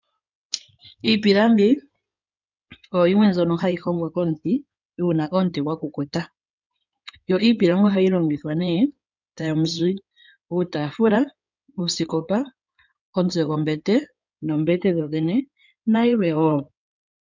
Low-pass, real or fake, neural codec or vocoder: 7.2 kHz; fake; codec, 16 kHz in and 24 kHz out, 2.2 kbps, FireRedTTS-2 codec